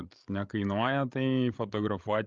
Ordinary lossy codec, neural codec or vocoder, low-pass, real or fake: Opus, 24 kbps; codec, 16 kHz, 16 kbps, FreqCodec, larger model; 7.2 kHz; fake